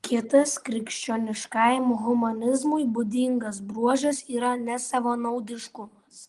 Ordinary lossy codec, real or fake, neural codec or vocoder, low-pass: Opus, 24 kbps; real; none; 10.8 kHz